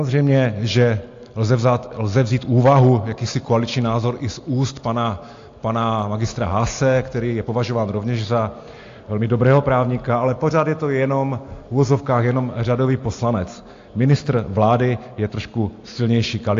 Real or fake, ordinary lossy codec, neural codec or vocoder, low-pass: real; AAC, 48 kbps; none; 7.2 kHz